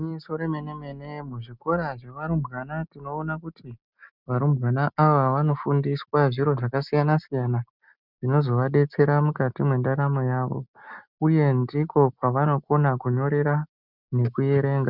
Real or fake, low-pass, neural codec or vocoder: real; 5.4 kHz; none